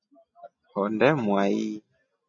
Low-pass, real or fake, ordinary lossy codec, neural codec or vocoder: 7.2 kHz; real; MP3, 96 kbps; none